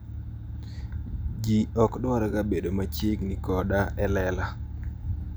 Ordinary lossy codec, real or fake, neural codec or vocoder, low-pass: none; real; none; none